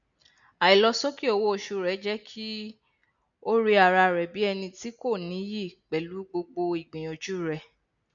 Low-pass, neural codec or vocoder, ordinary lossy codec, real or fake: 7.2 kHz; none; none; real